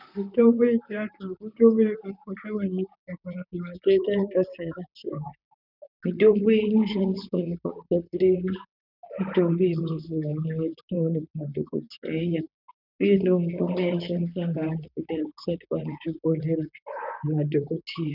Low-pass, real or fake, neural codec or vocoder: 5.4 kHz; fake; vocoder, 44.1 kHz, 128 mel bands, Pupu-Vocoder